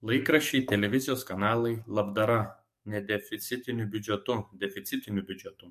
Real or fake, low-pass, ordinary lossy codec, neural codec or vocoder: fake; 14.4 kHz; MP3, 64 kbps; codec, 44.1 kHz, 7.8 kbps, DAC